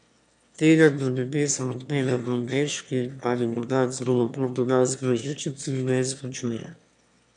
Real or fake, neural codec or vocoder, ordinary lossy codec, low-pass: fake; autoencoder, 22.05 kHz, a latent of 192 numbers a frame, VITS, trained on one speaker; none; 9.9 kHz